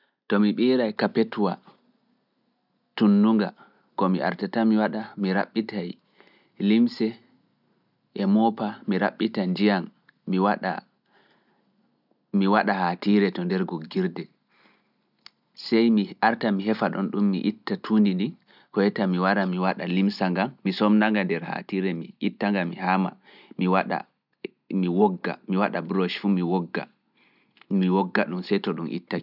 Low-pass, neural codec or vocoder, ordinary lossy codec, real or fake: 5.4 kHz; none; none; real